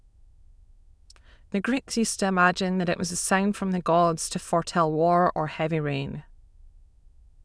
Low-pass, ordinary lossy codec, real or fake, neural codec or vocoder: none; none; fake; autoencoder, 22.05 kHz, a latent of 192 numbers a frame, VITS, trained on many speakers